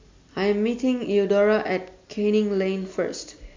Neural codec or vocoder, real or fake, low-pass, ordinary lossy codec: none; real; 7.2 kHz; MP3, 64 kbps